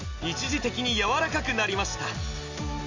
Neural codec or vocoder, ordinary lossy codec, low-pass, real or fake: none; AAC, 48 kbps; 7.2 kHz; real